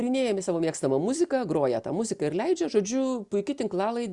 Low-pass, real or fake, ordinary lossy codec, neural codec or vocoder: 10.8 kHz; real; Opus, 64 kbps; none